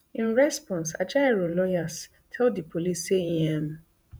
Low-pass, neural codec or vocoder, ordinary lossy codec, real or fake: none; vocoder, 48 kHz, 128 mel bands, Vocos; none; fake